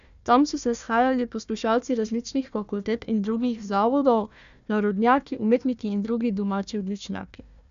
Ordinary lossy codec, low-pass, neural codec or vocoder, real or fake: AAC, 96 kbps; 7.2 kHz; codec, 16 kHz, 1 kbps, FunCodec, trained on Chinese and English, 50 frames a second; fake